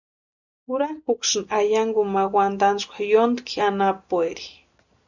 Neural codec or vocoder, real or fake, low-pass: none; real; 7.2 kHz